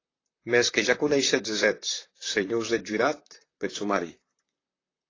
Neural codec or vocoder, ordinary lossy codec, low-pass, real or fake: vocoder, 44.1 kHz, 128 mel bands, Pupu-Vocoder; AAC, 32 kbps; 7.2 kHz; fake